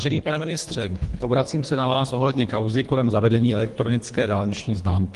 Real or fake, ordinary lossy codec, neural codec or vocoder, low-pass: fake; Opus, 24 kbps; codec, 24 kHz, 1.5 kbps, HILCodec; 10.8 kHz